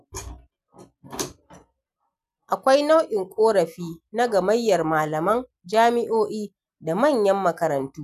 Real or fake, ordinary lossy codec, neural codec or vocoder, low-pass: real; none; none; 14.4 kHz